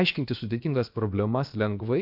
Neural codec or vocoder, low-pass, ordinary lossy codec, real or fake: codec, 16 kHz, about 1 kbps, DyCAST, with the encoder's durations; 5.4 kHz; MP3, 48 kbps; fake